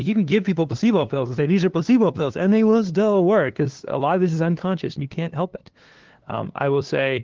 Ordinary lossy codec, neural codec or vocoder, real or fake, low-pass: Opus, 16 kbps; codec, 16 kHz, 2 kbps, FunCodec, trained on LibriTTS, 25 frames a second; fake; 7.2 kHz